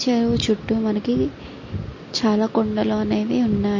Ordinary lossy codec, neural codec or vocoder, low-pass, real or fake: MP3, 32 kbps; none; 7.2 kHz; real